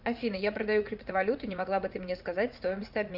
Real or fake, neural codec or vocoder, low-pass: fake; vocoder, 44.1 kHz, 80 mel bands, Vocos; 5.4 kHz